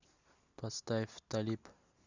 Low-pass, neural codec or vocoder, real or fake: 7.2 kHz; none; real